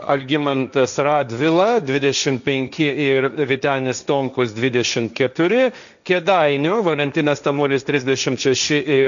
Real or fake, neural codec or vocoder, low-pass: fake; codec, 16 kHz, 1.1 kbps, Voila-Tokenizer; 7.2 kHz